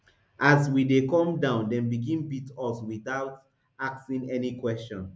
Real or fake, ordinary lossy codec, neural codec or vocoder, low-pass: real; none; none; none